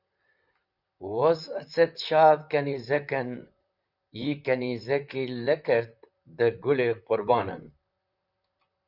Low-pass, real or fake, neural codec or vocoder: 5.4 kHz; fake; vocoder, 44.1 kHz, 128 mel bands, Pupu-Vocoder